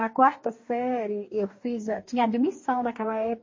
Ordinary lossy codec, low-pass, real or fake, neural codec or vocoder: MP3, 32 kbps; 7.2 kHz; fake; codec, 44.1 kHz, 2.6 kbps, DAC